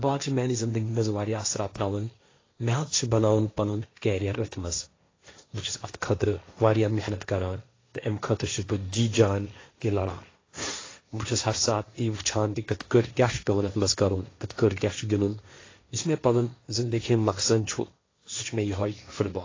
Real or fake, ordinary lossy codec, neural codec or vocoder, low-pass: fake; AAC, 32 kbps; codec, 16 kHz, 1.1 kbps, Voila-Tokenizer; 7.2 kHz